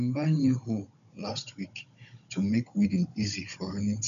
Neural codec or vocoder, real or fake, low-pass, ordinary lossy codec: codec, 16 kHz, 16 kbps, FunCodec, trained on Chinese and English, 50 frames a second; fake; 7.2 kHz; none